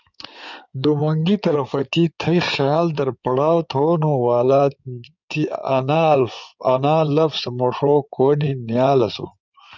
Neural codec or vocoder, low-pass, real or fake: codec, 16 kHz in and 24 kHz out, 2.2 kbps, FireRedTTS-2 codec; 7.2 kHz; fake